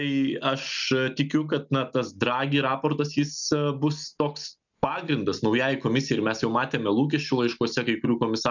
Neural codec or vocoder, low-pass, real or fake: none; 7.2 kHz; real